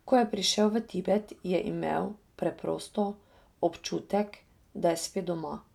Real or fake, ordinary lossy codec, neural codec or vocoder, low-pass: real; none; none; 19.8 kHz